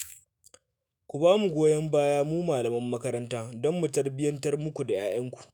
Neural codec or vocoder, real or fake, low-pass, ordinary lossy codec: autoencoder, 48 kHz, 128 numbers a frame, DAC-VAE, trained on Japanese speech; fake; none; none